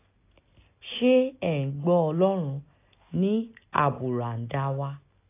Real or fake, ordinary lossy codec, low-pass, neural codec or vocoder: real; AAC, 24 kbps; 3.6 kHz; none